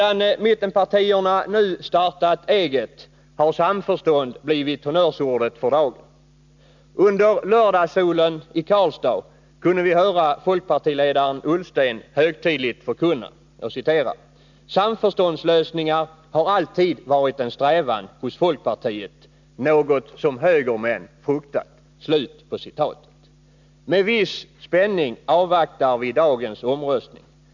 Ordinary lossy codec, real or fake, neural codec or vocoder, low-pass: none; real; none; 7.2 kHz